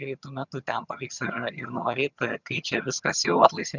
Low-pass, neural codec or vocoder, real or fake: 7.2 kHz; vocoder, 22.05 kHz, 80 mel bands, HiFi-GAN; fake